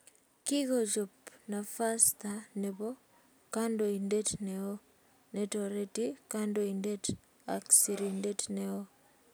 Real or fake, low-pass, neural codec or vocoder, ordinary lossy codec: real; none; none; none